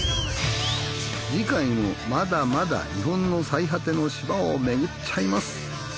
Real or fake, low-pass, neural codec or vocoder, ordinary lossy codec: real; none; none; none